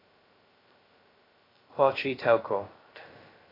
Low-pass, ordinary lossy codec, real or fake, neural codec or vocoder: 5.4 kHz; AAC, 24 kbps; fake; codec, 16 kHz, 0.2 kbps, FocalCodec